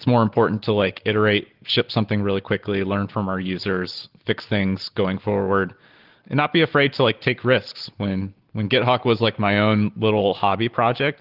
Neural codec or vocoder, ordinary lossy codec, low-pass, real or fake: none; Opus, 16 kbps; 5.4 kHz; real